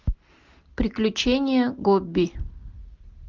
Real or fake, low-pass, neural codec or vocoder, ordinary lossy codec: real; 7.2 kHz; none; Opus, 32 kbps